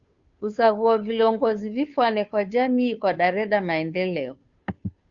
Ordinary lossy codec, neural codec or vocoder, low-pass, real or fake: Opus, 64 kbps; codec, 16 kHz, 2 kbps, FunCodec, trained on Chinese and English, 25 frames a second; 7.2 kHz; fake